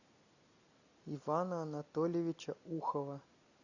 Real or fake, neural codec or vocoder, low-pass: real; none; 7.2 kHz